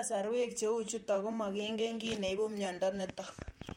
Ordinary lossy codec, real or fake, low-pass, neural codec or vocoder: MP3, 64 kbps; fake; 19.8 kHz; vocoder, 44.1 kHz, 128 mel bands, Pupu-Vocoder